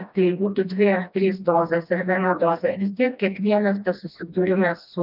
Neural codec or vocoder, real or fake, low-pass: codec, 16 kHz, 1 kbps, FreqCodec, smaller model; fake; 5.4 kHz